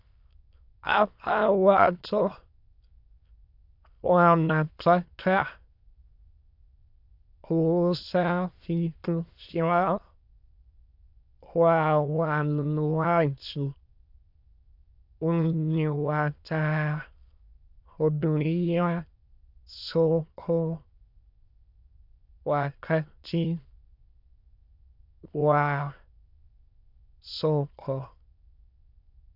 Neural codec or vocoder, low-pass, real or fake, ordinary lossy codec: autoencoder, 22.05 kHz, a latent of 192 numbers a frame, VITS, trained on many speakers; 5.4 kHz; fake; AAC, 48 kbps